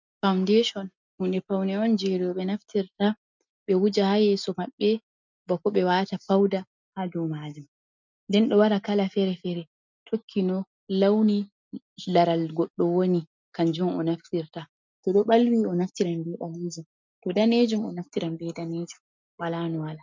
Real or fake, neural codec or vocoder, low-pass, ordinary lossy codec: real; none; 7.2 kHz; MP3, 64 kbps